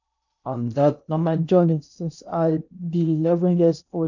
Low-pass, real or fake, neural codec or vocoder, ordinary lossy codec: 7.2 kHz; fake; codec, 16 kHz in and 24 kHz out, 0.8 kbps, FocalCodec, streaming, 65536 codes; none